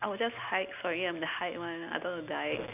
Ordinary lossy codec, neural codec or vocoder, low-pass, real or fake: none; none; 3.6 kHz; real